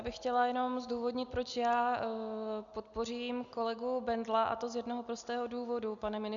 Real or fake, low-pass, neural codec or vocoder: real; 7.2 kHz; none